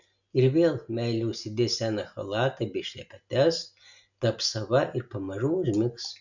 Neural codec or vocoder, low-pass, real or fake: none; 7.2 kHz; real